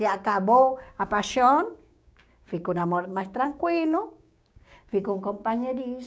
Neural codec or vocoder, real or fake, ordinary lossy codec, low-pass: codec, 16 kHz, 6 kbps, DAC; fake; none; none